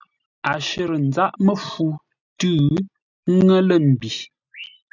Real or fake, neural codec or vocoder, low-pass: real; none; 7.2 kHz